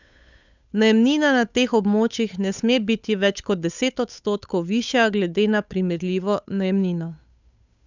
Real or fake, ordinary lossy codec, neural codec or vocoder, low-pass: fake; none; codec, 16 kHz, 8 kbps, FunCodec, trained on Chinese and English, 25 frames a second; 7.2 kHz